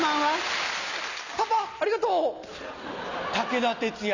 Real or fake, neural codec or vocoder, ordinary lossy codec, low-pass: real; none; none; 7.2 kHz